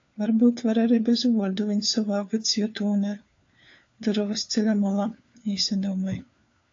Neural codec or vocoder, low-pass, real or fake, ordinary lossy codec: codec, 16 kHz, 4 kbps, FunCodec, trained on LibriTTS, 50 frames a second; 7.2 kHz; fake; AAC, 48 kbps